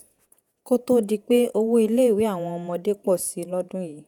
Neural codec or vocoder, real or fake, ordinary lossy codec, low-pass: vocoder, 48 kHz, 128 mel bands, Vocos; fake; none; 19.8 kHz